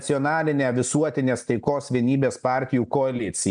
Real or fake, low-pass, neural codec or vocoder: real; 9.9 kHz; none